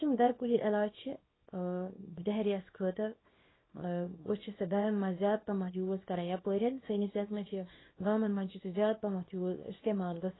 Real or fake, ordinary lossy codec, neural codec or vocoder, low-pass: fake; AAC, 16 kbps; codec, 24 kHz, 0.9 kbps, WavTokenizer, small release; 7.2 kHz